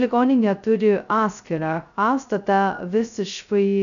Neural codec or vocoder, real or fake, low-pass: codec, 16 kHz, 0.2 kbps, FocalCodec; fake; 7.2 kHz